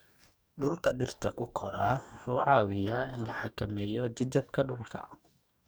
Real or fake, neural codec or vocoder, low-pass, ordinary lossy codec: fake; codec, 44.1 kHz, 2.6 kbps, DAC; none; none